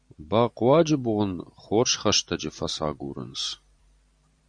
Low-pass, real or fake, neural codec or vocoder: 9.9 kHz; fake; vocoder, 44.1 kHz, 128 mel bands every 512 samples, BigVGAN v2